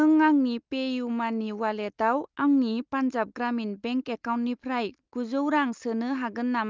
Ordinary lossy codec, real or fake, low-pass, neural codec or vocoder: Opus, 24 kbps; real; 7.2 kHz; none